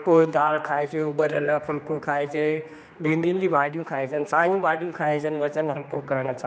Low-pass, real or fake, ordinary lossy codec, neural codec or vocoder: none; fake; none; codec, 16 kHz, 2 kbps, X-Codec, HuBERT features, trained on general audio